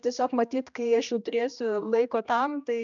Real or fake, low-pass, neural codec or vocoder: fake; 7.2 kHz; codec, 16 kHz, 1 kbps, X-Codec, HuBERT features, trained on general audio